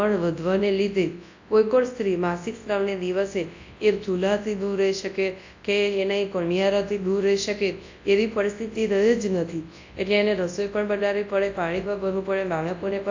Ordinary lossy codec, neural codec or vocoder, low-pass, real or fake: AAC, 48 kbps; codec, 24 kHz, 0.9 kbps, WavTokenizer, large speech release; 7.2 kHz; fake